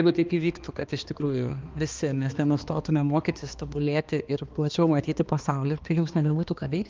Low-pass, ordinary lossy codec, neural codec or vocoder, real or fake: 7.2 kHz; Opus, 24 kbps; codec, 16 kHz, 2 kbps, X-Codec, HuBERT features, trained on balanced general audio; fake